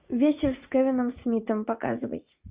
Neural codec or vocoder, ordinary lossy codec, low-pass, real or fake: vocoder, 44.1 kHz, 80 mel bands, Vocos; Opus, 64 kbps; 3.6 kHz; fake